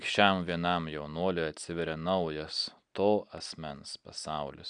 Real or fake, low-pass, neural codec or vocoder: real; 9.9 kHz; none